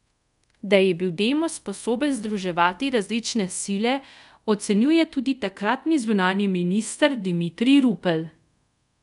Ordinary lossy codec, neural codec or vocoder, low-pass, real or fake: none; codec, 24 kHz, 0.5 kbps, DualCodec; 10.8 kHz; fake